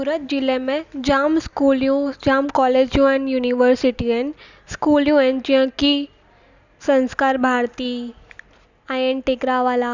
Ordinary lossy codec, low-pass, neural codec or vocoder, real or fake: Opus, 64 kbps; 7.2 kHz; none; real